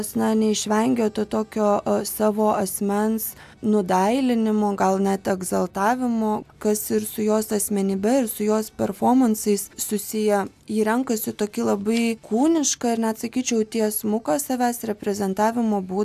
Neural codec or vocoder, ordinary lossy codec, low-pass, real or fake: none; AAC, 96 kbps; 14.4 kHz; real